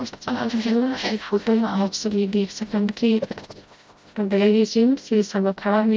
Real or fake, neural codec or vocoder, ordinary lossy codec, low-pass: fake; codec, 16 kHz, 0.5 kbps, FreqCodec, smaller model; none; none